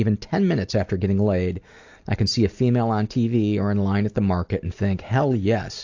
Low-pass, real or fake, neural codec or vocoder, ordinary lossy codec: 7.2 kHz; real; none; AAC, 48 kbps